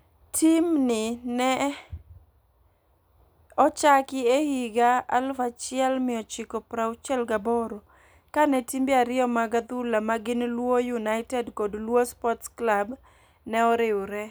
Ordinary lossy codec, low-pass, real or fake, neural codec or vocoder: none; none; real; none